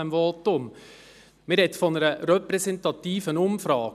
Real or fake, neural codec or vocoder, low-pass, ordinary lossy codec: real; none; 14.4 kHz; none